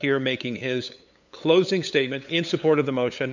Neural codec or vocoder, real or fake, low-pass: codec, 16 kHz, 8 kbps, FunCodec, trained on LibriTTS, 25 frames a second; fake; 7.2 kHz